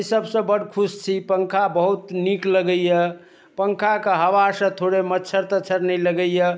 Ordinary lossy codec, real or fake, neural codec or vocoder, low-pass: none; real; none; none